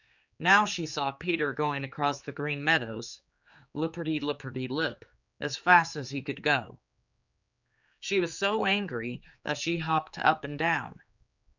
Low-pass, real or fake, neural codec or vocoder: 7.2 kHz; fake; codec, 16 kHz, 4 kbps, X-Codec, HuBERT features, trained on general audio